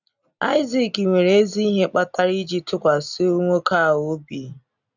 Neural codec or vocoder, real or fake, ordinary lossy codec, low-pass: none; real; none; 7.2 kHz